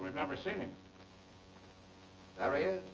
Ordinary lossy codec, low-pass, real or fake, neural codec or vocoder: Opus, 24 kbps; 7.2 kHz; fake; vocoder, 24 kHz, 100 mel bands, Vocos